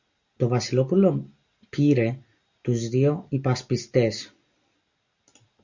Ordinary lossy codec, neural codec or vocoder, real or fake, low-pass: Opus, 64 kbps; none; real; 7.2 kHz